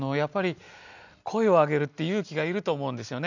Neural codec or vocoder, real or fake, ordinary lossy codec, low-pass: none; real; none; 7.2 kHz